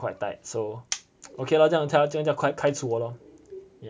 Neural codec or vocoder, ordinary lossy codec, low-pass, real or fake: none; none; none; real